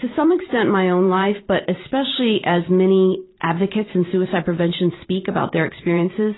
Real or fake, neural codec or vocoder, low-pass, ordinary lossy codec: fake; vocoder, 44.1 kHz, 128 mel bands every 512 samples, BigVGAN v2; 7.2 kHz; AAC, 16 kbps